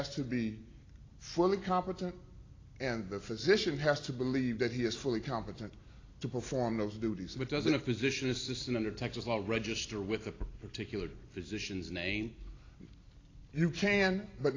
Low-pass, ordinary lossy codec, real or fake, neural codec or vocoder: 7.2 kHz; AAC, 32 kbps; real; none